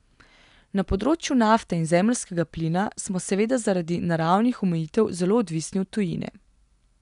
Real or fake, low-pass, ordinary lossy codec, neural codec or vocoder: real; 10.8 kHz; none; none